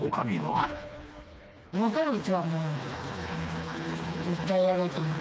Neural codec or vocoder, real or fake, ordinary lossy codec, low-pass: codec, 16 kHz, 2 kbps, FreqCodec, smaller model; fake; none; none